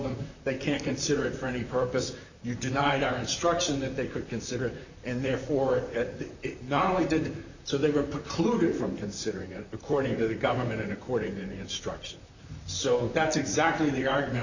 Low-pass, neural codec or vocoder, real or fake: 7.2 kHz; vocoder, 44.1 kHz, 128 mel bands, Pupu-Vocoder; fake